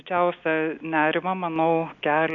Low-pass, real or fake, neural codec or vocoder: 7.2 kHz; real; none